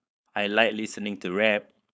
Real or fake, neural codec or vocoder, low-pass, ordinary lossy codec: fake; codec, 16 kHz, 4.8 kbps, FACodec; none; none